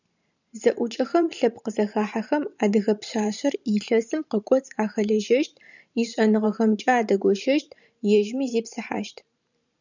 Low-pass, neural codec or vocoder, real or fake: 7.2 kHz; vocoder, 44.1 kHz, 128 mel bands every 512 samples, BigVGAN v2; fake